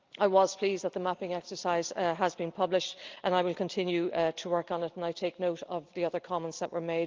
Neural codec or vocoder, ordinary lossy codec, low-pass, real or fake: none; Opus, 24 kbps; 7.2 kHz; real